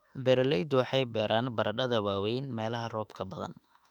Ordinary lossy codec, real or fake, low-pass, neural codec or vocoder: none; fake; 19.8 kHz; autoencoder, 48 kHz, 32 numbers a frame, DAC-VAE, trained on Japanese speech